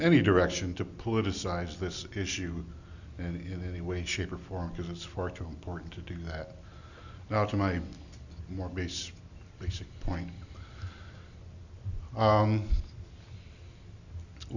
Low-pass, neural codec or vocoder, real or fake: 7.2 kHz; none; real